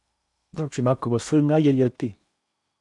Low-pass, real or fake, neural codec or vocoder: 10.8 kHz; fake; codec, 16 kHz in and 24 kHz out, 0.8 kbps, FocalCodec, streaming, 65536 codes